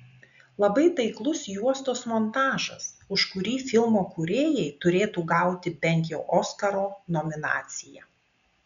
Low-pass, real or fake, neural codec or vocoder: 7.2 kHz; real; none